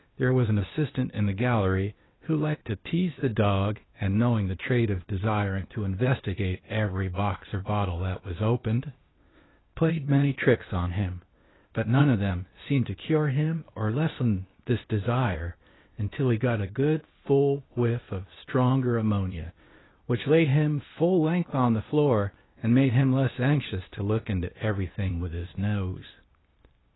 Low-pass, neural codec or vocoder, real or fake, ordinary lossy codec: 7.2 kHz; codec, 16 kHz, 0.8 kbps, ZipCodec; fake; AAC, 16 kbps